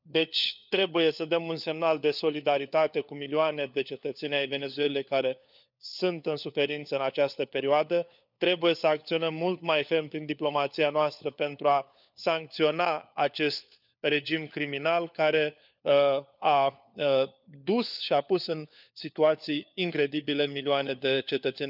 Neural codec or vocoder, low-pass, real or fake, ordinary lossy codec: codec, 16 kHz, 4 kbps, FunCodec, trained on LibriTTS, 50 frames a second; 5.4 kHz; fake; none